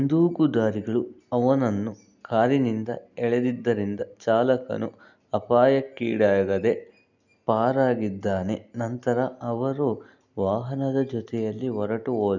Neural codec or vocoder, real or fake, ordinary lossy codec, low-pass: none; real; none; 7.2 kHz